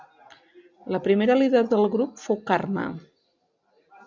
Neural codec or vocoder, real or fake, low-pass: none; real; 7.2 kHz